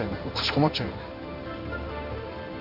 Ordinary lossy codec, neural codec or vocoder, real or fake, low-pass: none; codec, 16 kHz in and 24 kHz out, 1 kbps, XY-Tokenizer; fake; 5.4 kHz